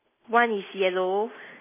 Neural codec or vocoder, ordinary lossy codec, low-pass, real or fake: none; MP3, 16 kbps; 3.6 kHz; real